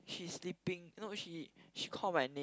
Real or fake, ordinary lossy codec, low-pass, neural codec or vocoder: real; none; none; none